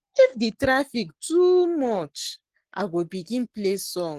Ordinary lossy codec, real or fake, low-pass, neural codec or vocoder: Opus, 16 kbps; fake; 14.4 kHz; codec, 44.1 kHz, 7.8 kbps, Pupu-Codec